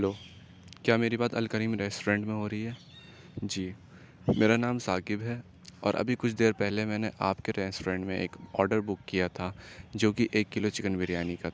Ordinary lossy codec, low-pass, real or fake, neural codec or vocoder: none; none; real; none